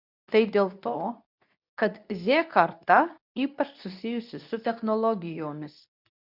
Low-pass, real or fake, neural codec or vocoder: 5.4 kHz; fake; codec, 24 kHz, 0.9 kbps, WavTokenizer, medium speech release version 2